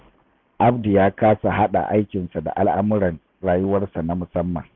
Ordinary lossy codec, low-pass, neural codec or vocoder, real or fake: none; 7.2 kHz; none; real